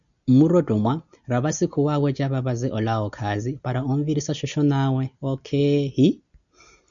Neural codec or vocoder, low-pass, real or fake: none; 7.2 kHz; real